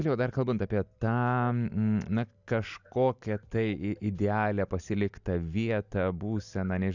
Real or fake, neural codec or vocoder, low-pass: real; none; 7.2 kHz